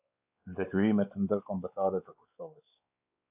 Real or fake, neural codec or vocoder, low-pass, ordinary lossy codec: fake; codec, 16 kHz, 4 kbps, X-Codec, WavLM features, trained on Multilingual LibriSpeech; 3.6 kHz; AAC, 32 kbps